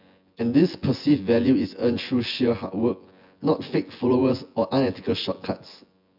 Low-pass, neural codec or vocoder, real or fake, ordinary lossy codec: 5.4 kHz; vocoder, 24 kHz, 100 mel bands, Vocos; fake; MP3, 48 kbps